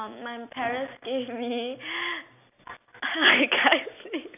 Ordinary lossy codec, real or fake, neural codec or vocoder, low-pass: none; real; none; 3.6 kHz